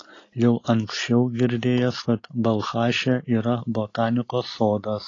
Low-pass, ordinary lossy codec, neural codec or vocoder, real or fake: 7.2 kHz; AAC, 32 kbps; none; real